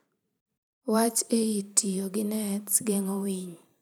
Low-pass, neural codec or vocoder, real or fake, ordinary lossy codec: none; vocoder, 44.1 kHz, 128 mel bands every 512 samples, BigVGAN v2; fake; none